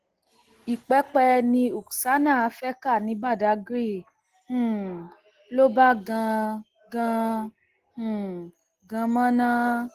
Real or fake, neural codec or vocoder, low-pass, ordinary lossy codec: real; none; 14.4 kHz; Opus, 16 kbps